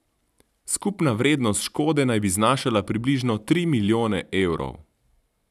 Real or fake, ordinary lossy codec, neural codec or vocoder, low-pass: real; none; none; 14.4 kHz